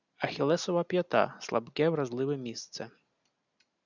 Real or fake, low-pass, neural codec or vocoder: real; 7.2 kHz; none